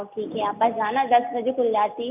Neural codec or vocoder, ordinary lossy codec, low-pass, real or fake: none; none; 3.6 kHz; real